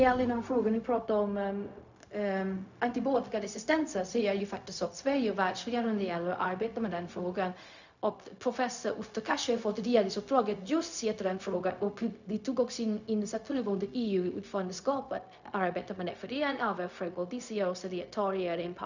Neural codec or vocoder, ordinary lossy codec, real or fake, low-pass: codec, 16 kHz, 0.4 kbps, LongCat-Audio-Codec; none; fake; 7.2 kHz